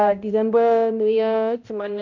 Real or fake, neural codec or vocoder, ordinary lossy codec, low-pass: fake; codec, 16 kHz, 0.5 kbps, X-Codec, HuBERT features, trained on balanced general audio; none; 7.2 kHz